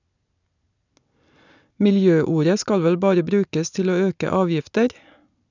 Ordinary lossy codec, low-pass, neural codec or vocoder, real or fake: none; 7.2 kHz; none; real